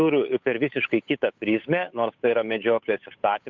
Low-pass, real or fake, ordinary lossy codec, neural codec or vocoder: 7.2 kHz; fake; MP3, 64 kbps; codec, 16 kHz, 8 kbps, FunCodec, trained on Chinese and English, 25 frames a second